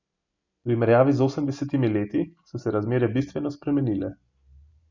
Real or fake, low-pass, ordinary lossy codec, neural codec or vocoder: real; 7.2 kHz; none; none